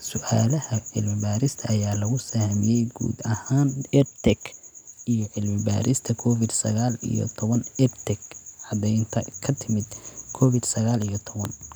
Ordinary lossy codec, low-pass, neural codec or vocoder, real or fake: none; none; none; real